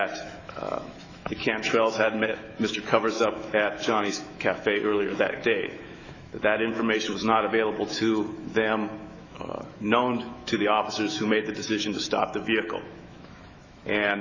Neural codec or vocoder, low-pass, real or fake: autoencoder, 48 kHz, 128 numbers a frame, DAC-VAE, trained on Japanese speech; 7.2 kHz; fake